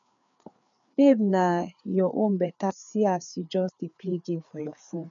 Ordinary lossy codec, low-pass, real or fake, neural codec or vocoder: none; 7.2 kHz; fake; codec, 16 kHz, 4 kbps, FreqCodec, larger model